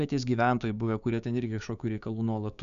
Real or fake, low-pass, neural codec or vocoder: fake; 7.2 kHz; codec, 16 kHz, 6 kbps, DAC